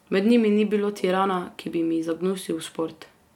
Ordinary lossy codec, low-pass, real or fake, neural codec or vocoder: MP3, 96 kbps; 19.8 kHz; real; none